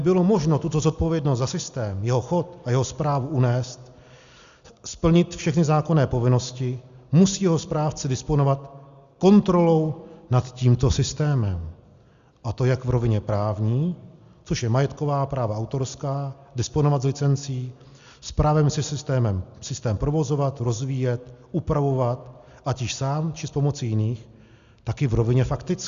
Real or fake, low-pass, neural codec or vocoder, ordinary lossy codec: real; 7.2 kHz; none; Opus, 64 kbps